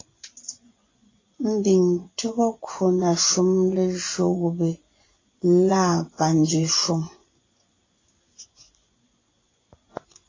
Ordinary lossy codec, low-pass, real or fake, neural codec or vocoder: AAC, 32 kbps; 7.2 kHz; fake; vocoder, 24 kHz, 100 mel bands, Vocos